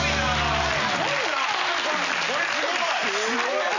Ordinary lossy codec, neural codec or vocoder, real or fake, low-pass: none; none; real; 7.2 kHz